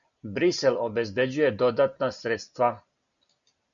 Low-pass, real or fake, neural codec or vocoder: 7.2 kHz; real; none